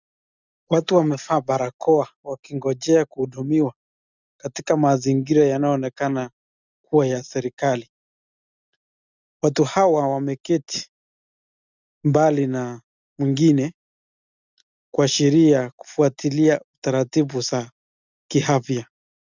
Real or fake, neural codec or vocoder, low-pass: real; none; 7.2 kHz